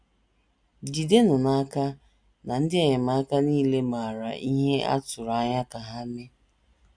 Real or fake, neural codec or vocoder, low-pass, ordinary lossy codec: real; none; 9.9 kHz; none